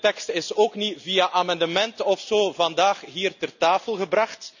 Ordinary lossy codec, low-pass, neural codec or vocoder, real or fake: none; 7.2 kHz; none; real